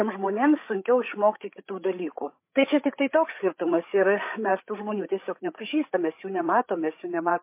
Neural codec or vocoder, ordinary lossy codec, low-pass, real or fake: codec, 16 kHz, 8 kbps, FreqCodec, larger model; MP3, 24 kbps; 3.6 kHz; fake